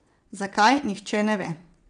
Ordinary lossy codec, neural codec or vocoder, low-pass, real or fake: none; vocoder, 22.05 kHz, 80 mel bands, WaveNeXt; 9.9 kHz; fake